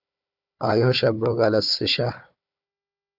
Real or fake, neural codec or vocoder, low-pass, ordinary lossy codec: fake; codec, 16 kHz, 4 kbps, FunCodec, trained on Chinese and English, 50 frames a second; 5.4 kHz; AAC, 48 kbps